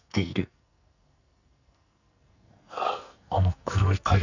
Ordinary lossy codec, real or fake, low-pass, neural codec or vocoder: none; fake; 7.2 kHz; codec, 44.1 kHz, 2.6 kbps, SNAC